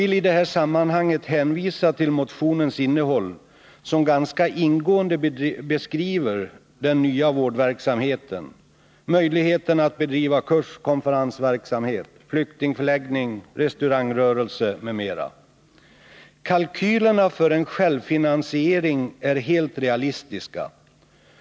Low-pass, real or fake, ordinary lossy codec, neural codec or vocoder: none; real; none; none